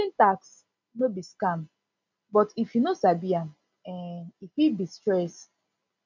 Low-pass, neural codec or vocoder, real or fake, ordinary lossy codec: 7.2 kHz; none; real; none